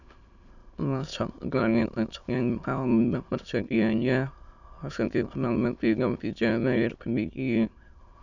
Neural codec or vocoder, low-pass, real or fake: autoencoder, 22.05 kHz, a latent of 192 numbers a frame, VITS, trained on many speakers; 7.2 kHz; fake